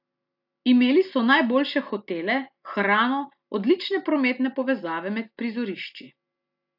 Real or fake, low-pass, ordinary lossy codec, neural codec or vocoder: real; 5.4 kHz; AAC, 48 kbps; none